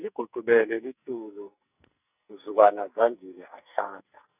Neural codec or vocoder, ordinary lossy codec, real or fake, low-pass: codec, 44.1 kHz, 2.6 kbps, SNAC; none; fake; 3.6 kHz